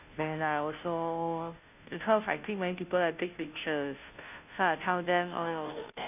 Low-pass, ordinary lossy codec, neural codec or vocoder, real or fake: 3.6 kHz; none; codec, 16 kHz, 0.5 kbps, FunCodec, trained on Chinese and English, 25 frames a second; fake